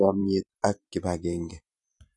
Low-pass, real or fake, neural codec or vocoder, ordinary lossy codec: 10.8 kHz; fake; vocoder, 44.1 kHz, 128 mel bands every 512 samples, BigVGAN v2; none